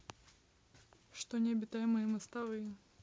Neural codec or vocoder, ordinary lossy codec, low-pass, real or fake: none; none; none; real